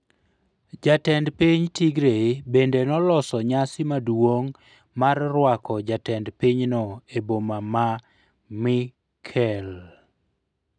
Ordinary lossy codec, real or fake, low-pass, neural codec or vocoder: none; real; 9.9 kHz; none